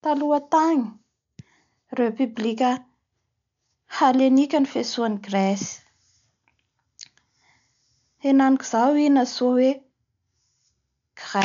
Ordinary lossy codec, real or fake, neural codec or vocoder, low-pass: MP3, 64 kbps; real; none; 7.2 kHz